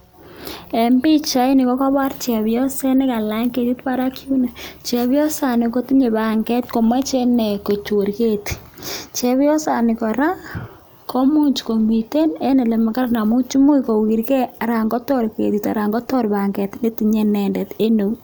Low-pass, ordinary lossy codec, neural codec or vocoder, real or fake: none; none; none; real